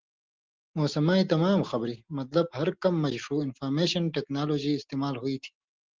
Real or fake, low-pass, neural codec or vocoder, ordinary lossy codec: real; 7.2 kHz; none; Opus, 16 kbps